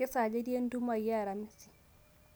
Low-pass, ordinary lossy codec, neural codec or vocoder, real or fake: none; none; none; real